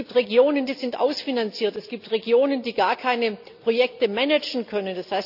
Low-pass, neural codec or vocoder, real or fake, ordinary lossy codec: 5.4 kHz; none; real; none